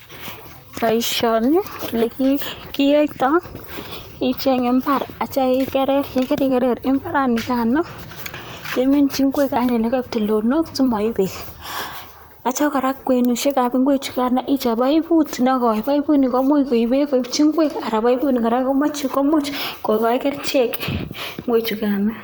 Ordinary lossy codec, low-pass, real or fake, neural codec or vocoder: none; none; fake; vocoder, 44.1 kHz, 128 mel bands, Pupu-Vocoder